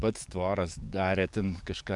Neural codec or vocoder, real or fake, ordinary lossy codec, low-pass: codec, 44.1 kHz, 7.8 kbps, DAC; fake; MP3, 96 kbps; 10.8 kHz